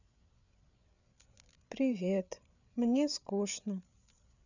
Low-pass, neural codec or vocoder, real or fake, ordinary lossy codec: 7.2 kHz; codec, 16 kHz, 8 kbps, FreqCodec, larger model; fake; none